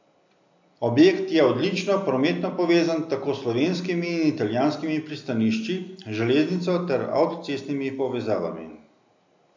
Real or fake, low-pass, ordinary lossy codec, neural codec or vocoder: real; 7.2 kHz; MP3, 64 kbps; none